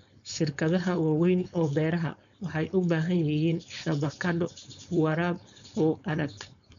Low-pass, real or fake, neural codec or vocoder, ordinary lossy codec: 7.2 kHz; fake; codec, 16 kHz, 4.8 kbps, FACodec; none